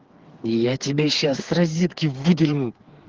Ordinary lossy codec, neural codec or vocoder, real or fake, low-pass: Opus, 16 kbps; codec, 16 kHz, 4 kbps, FreqCodec, smaller model; fake; 7.2 kHz